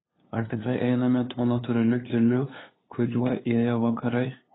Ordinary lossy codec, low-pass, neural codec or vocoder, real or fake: AAC, 16 kbps; 7.2 kHz; codec, 16 kHz, 2 kbps, FunCodec, trained on LibriTTS, 25 frames a second; fake